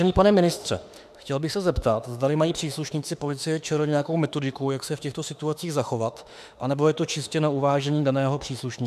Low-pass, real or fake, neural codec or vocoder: 14.4 kHz; fake; autoencoder, 48 kHz, 32 numbers a frame, DAC-VAE, trained on Japanese speech